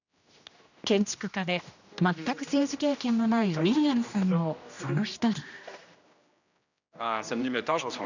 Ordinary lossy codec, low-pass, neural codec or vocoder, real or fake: none; 7.2 kHz; codec, 16 kHz, 1 kbps, X-Codec, HuBERT features, trained on general audio; fake